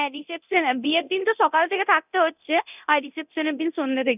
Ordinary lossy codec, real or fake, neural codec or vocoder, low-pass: none; fake; codec, 24 kHz, 0.9 kbps, DualCodec; 3.6 kHz